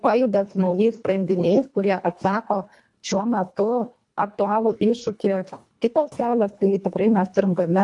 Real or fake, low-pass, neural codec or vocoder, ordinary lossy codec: fake; 10.8 kHz; codec, 24 kHz, 1.5 kbps, HILCodec; AAC, 64 kbps